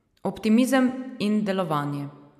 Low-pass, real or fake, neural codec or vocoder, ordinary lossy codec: 14.4 kHz; real; none; MP3, 96 kbps